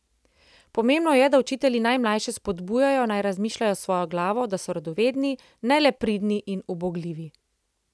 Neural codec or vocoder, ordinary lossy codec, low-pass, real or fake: none; none; none; real